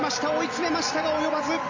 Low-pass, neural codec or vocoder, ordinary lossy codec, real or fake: 7.2 kHz; vocoder, 44.1 kHz, 128 mel bands every 256 samples, BigVGAN v2; MP3, 64 kbps; fake